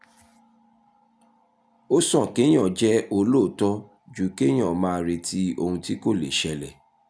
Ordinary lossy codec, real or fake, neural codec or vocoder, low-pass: none; real; none; none